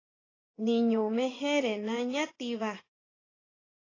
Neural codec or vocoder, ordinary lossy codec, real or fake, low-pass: vocoder, 24 kHz, 100 mel bands, Vocos; AAC, 32 kbps; fake; 7.2 kHz